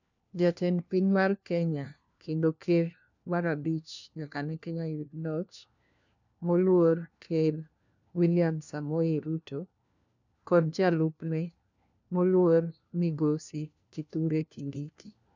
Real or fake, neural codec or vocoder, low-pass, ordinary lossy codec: fake; codec, 16 kHz, 1 kbps, FunCodec, trained on LibriTTS, 50 frames a second; 7.2 kHz; none